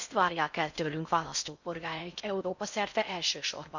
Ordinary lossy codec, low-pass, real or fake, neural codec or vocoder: none; 7.2 kHz; fake; codec, 16 kHz in and 24 kHz out, 0.6 kbps, FocalCodec, streaming, 4096 codes